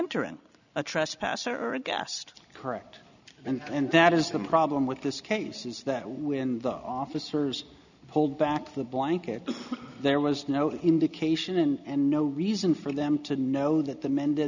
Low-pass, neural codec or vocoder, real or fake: 7.2 kHz; none; real